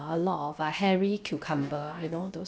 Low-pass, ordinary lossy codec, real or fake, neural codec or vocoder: none; none; fake; codec, 16 kHz, about 1 kbps, DyCAST, with the encoder's durations